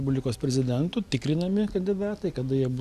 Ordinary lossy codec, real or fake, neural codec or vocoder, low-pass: Opus, 64 kbps; real; none; 14.4 kHz